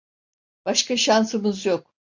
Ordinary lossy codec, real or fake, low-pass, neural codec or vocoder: AAC, 48 kbps; real; 7.2 kHz; none